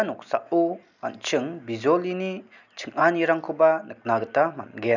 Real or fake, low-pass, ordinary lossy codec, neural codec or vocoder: real; 7.2 kHz; none; none